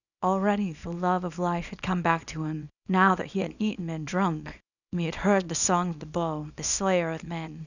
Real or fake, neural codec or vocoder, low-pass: fake; codec, 24 kHz, 0.9 kbps, WavTokenizer, small release; 7.2 kHz